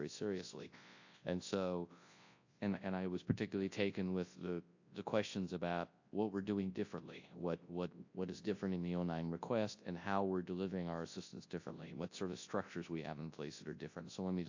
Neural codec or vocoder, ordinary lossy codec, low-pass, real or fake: codec, 24 kHz, 0.9 kbps, WavTokenizer, large speech release; AAC, 48 kbps; 7.2 kHz; fake